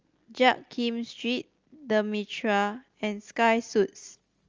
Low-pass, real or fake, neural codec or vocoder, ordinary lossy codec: 7.2 kHz; real; none; Opus, 32 kbps